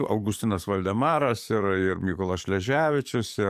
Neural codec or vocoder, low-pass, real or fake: codec, 44.1 kHz, 7.8 kbps, DAC; 14.4 kHz; fake